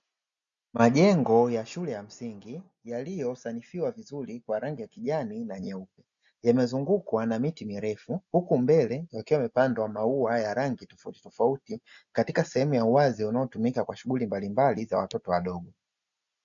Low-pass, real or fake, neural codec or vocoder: 7.2 kHz; real; none